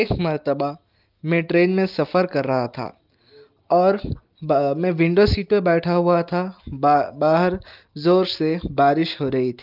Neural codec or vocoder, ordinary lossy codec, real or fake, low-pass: none; Opus, 24 kbps; real; 5.4 kHz